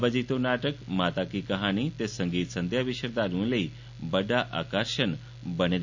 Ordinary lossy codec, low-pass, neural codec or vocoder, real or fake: MP3, 64 kbps; 7.2 kHz; none; real